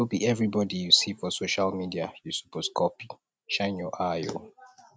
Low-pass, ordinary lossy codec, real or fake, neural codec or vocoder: none; none; real; none